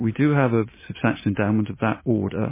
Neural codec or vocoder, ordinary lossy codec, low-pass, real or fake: none; MP3, 16 kbps; 3.6 kHz; real